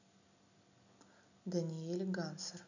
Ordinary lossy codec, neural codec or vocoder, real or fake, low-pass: none; none; real; 7.2 kHz